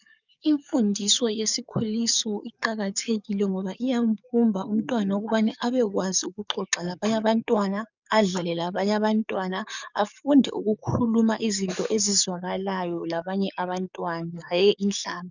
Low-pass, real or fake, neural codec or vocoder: 7.2 kHz; fake; codec, 44.1 kHz, 7.8 kbps, DAC